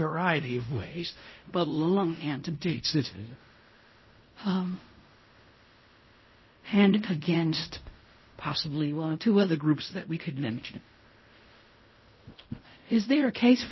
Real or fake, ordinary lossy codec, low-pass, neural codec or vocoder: fake; MP3, 24 kbps; 7.2 kHz; codec, 16 kHz in and 24 kHz out, 0.4 kbps, LongCat-Audio-Codec, fine tuned four codebook decoder